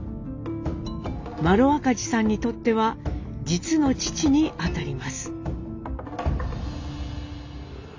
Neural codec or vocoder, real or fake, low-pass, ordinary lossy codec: none; real; 7.2 kHz; AAC, 48 kbps